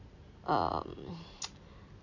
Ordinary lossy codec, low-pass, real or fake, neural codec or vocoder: none; 7.2 kHz; real; none